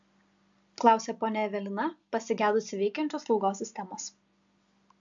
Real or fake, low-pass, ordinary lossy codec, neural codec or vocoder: real; 7.2 kHz; AAC, 64 kbps; none